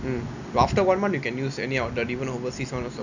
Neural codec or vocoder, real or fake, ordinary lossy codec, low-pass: none; real; none; 7.2 kHz